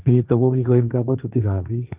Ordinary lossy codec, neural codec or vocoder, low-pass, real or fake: Opus, 24 kbps; codec, 16 kHz, 1.1 kbps, Voila-Tokenizer; 3.6 kHz; fake